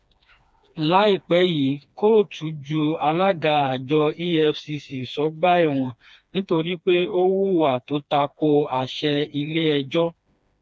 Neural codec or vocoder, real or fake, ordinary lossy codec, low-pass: codec, 16 kHz, 2 kbps, FreqCodec, smaller model; fake; none; none